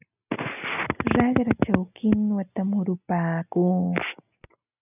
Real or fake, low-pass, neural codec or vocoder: real; 3.6 kHz; none